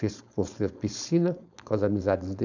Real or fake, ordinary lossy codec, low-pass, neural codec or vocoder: fake; none; 7.2 kHz; codec, 16 kHz, 4.8 kbps, FACodec